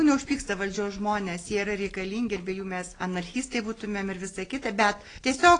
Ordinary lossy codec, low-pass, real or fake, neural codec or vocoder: AAC, 32 kbps; 9.9 kHz; real; none